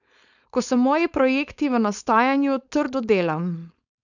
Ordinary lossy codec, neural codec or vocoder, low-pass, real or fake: none; codec, 16 kHz, 4.8 kbps, FACodec; 7.2 kHz; fake